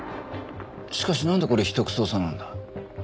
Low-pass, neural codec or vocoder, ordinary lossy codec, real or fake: none; none; none; real